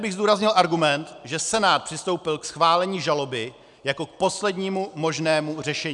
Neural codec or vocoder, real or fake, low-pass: none; real; 10.8 kHz